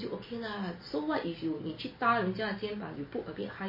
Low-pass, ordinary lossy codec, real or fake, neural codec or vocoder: 5.4 kHz; MP3, 24 kbps; real; none